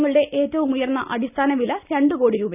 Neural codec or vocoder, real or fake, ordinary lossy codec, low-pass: none; real; none; 3.6 kHz